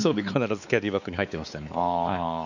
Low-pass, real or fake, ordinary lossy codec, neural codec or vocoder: 7.2 kHz; fake; none; codec, 16 kHz, 4 kbps, X-Codec, WavLM features, trained on Multilingual LibriSpeech